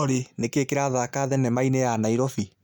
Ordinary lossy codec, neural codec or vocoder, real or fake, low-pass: none; none; real; none